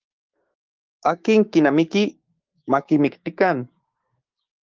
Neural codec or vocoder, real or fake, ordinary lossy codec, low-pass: codec, 16 kHz, 6 kbps, DAC; fake; Opus, 32 kbps; 7.2 kHz